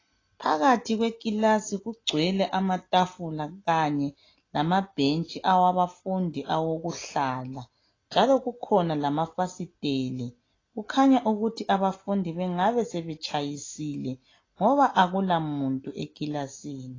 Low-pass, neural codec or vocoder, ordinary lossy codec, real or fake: 7.2 kHz; none; AAC, 32 kbps; real